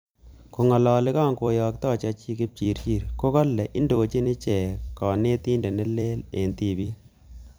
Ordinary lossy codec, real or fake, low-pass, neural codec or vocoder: none; fake; none; vocoder, 44.1 kHz, 128 mel bands every 256 samples, BigVGAN v2